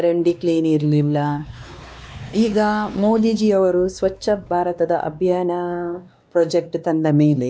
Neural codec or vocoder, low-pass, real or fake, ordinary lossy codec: codec, 16 kHz, 2 kbps, X-Codec, WavLM features, trained on Multilingual LibriSpeech; none; fake; none